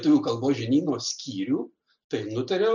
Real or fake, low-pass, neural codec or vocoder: real; 7.2 kHz; none